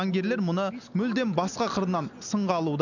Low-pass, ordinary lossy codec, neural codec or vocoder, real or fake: 7.2 kHz; none; none; real